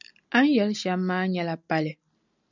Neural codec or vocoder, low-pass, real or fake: none; 7.2 kHz; real